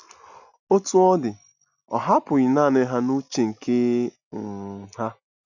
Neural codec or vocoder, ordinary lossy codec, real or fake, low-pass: none; none; real; 7.2 kHz